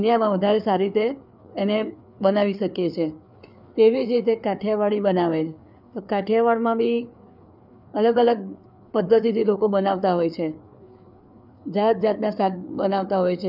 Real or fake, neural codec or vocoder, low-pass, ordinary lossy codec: fake; codec, 16 kHz, 4 kbps, FreqCodec, larger model; 5.4 kHz; none